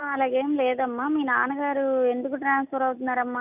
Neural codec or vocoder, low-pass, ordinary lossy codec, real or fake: none; 3.6 kHz; none; real